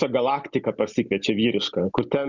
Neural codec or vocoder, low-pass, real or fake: none; 7.2 kHz; real